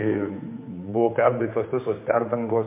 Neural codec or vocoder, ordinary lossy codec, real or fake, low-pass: codec, 16 kHz, 4 kbps, X-Codec, HuBERT features, trained on general audio; MP3, 24 kbps; fake; 3.6 kHz